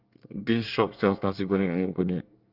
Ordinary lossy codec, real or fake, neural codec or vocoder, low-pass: Opus, 32 kbps; fake; codec, 24 kHz, 1 kbps, SNAC; 5.4 kHz